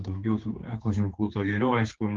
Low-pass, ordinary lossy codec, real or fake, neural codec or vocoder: 7.2 kHz; Opus, 16 kbps; fake; codec, 16 kHz, 4 kbps, X-Codec, HuBERT features, trained on general audio